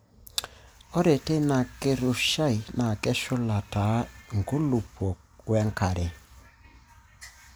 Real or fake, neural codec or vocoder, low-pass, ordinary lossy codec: real; none; none; none